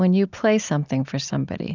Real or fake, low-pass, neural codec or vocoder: real; 7.2 kHz; none